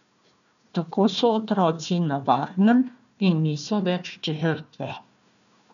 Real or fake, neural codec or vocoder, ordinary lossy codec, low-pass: fake; codec, 16 kHz, 1 kbps, FunCodec, trained on Chinese and English, 50 frames a second; none; 7.2 kHz